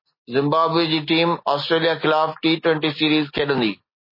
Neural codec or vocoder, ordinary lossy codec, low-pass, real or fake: none; MP3, 24 kbps; 5.4 kHz; real